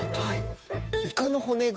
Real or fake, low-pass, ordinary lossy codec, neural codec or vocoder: fake; none; none; codec, 16 kHz, 2 kbps, FunCodec, trained on Chinese and English, 25 frames a second